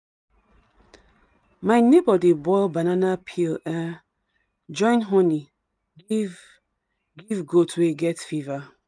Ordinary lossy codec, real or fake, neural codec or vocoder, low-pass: none; real; none; none